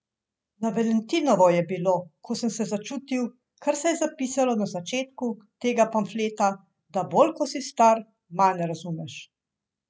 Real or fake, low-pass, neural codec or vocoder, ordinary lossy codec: real; none; none; none